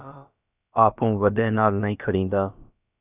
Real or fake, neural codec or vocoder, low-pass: fake; codec, 16 kHz, about 1 kbps, DyCAST, with the encoder's durations; 3.6 kHz